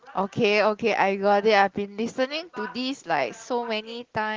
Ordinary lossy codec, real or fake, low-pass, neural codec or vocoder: Opus, 16 kbps; real; 7.2 kHz; none